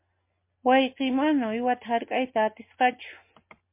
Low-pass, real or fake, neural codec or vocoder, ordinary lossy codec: 3.6 kHz; real; none; MP3, 24 kbps